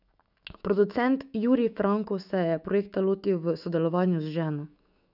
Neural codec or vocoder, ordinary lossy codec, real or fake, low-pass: autoencoder, 48 kHz, 128 numbers a frame, DAC-VAE, trained on Japanese speech; none; fake; 5.4 kHz